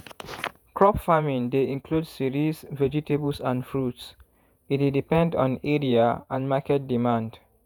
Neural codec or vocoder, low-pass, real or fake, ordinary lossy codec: vocoder, 48 kHz, 128 mel bands, Vocos; none; fake; none